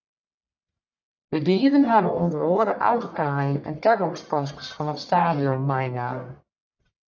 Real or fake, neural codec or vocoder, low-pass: fake; codec, 44.1 kHz, 1.7 kbps, Pupu-Codec; 7.2 kHz